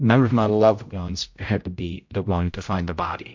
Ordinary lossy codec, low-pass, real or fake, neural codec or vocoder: MP3, 48 kbps; 7.2 kHz; fake; codec, 16 kHz, 0.5 kbps, X-Codec, HuBERT features, trained on general audio